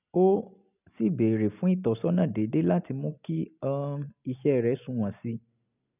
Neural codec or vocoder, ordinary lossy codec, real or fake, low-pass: none; none; real; 3.6 kHz